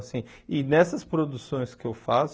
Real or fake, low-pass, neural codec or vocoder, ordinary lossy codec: real; none; none; none